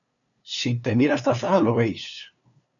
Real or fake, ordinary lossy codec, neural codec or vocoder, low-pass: fake; MP3, 96 kbps; codec, 16 kHz, 2 kbps, FunCodec, trained on LibriTTS, 25 frames a second; 7.2 kHz